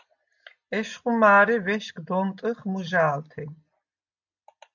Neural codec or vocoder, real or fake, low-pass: none; real; 7.2 kHz